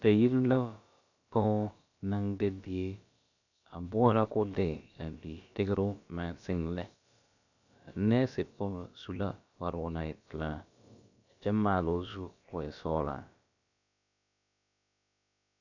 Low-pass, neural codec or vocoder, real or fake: 7.2 kHz; codec, 16 kHz, about 1 kbps, DyCAST, with the encoder's durations; fake